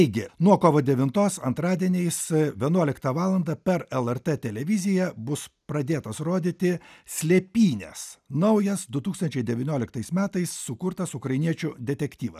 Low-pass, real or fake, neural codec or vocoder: 14.4 kHz; real; none